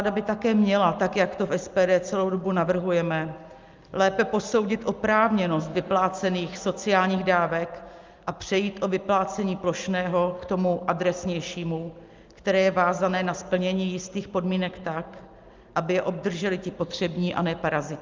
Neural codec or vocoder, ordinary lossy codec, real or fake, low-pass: none; Opus, 24 kbps; real; 7.2 kHz